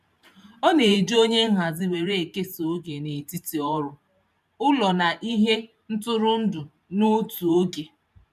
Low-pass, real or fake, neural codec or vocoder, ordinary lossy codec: 14.4 kHz; fake; vocoder, 44.1 kHz, 128 mel bands every 512 samples, BigVGAN v2; none